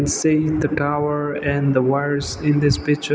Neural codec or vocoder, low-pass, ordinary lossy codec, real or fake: none; none; none; real